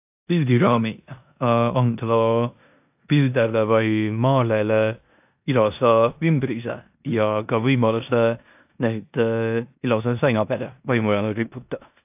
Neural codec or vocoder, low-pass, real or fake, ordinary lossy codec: codec, 16 kHz in and 24 kHz out, 0.9 kbps, LongCat-Audio-Codec, four codebook decoder; 3.6 kHz; fake; AAC, 32 kbps